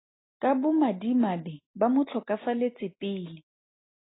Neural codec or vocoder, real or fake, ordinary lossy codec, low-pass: none; real; AAC, 16 kbps; 7.2 kHz